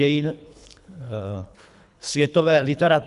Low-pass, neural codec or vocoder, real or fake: 10.8 kHz; codec, 24 kHz, 3 kbps, HILCodec; fake